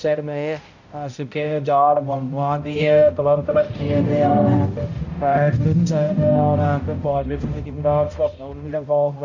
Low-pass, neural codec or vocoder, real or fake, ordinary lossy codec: 7.2 kHz; codec, 16 kHz, 0.5 kbps, X-Codec, HuBERT features, trained on balanced general audio; fake; none